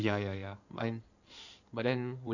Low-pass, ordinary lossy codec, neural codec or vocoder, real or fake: 7.2 kHz; none; codec, 16 kHz in and 24 kHz out, 2.2 kbps, FireRedTTS-2 codec; fake